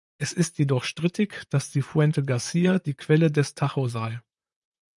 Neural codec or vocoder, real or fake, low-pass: vocoder, 44.1 kHz, 128 mel bands, Pupu-Vocoder; fake; 10.8 kHz